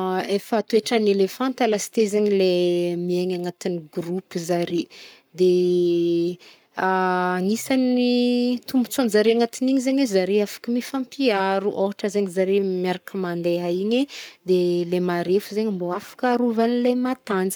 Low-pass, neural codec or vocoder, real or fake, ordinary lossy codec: none; codec, 44.1 kHz, 7.8 kbps, Pupu-Codec; fake; none